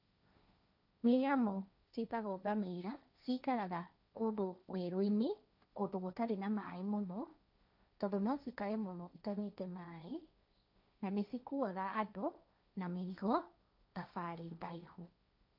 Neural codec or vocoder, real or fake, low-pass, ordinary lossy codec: codec, 16 kHz, 1.1 kbps, Voila-Tokenizer; fake; 5.4 kHz; none